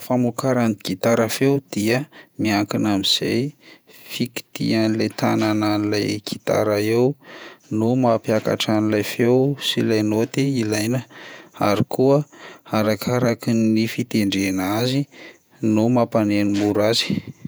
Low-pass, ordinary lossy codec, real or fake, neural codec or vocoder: none; none; fake; vocoder, 48 kHz, 128 mel bands, Vocos